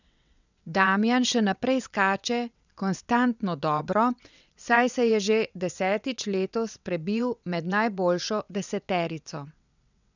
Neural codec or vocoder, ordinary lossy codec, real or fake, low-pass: vocoder, 22.05 kHz, 80 mel bands, WaveNeXt; none; fake; 7.2 kHz